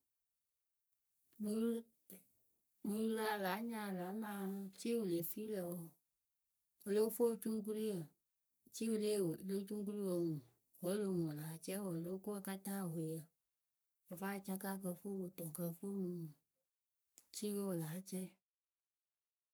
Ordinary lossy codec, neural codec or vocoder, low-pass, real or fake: none; codec, 44.1 kHz, 3.4 kbps, Pupu-Codec; none; fake